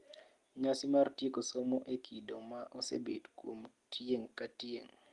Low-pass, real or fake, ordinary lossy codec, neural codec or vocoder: 10.8 kHz; real; Opus, 24 kbps; none